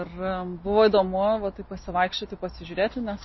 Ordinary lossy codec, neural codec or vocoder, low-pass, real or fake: MP3, 24 kbps; none; 7.2 kHz; real